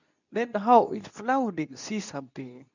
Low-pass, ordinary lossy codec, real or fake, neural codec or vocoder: 7.2 kHz; none; fake; codec, 24 kHz, 0.9 kbps, WavTokenizer, medium speech release version 2